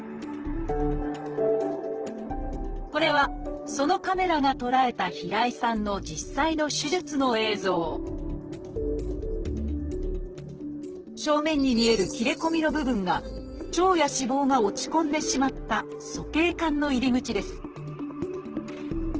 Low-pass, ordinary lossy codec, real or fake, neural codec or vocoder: 7.2 kHz; Opus, 16 kbps; fake; vocoder, 44.1 kHz, 128 mel bands, Pupu-Vocoder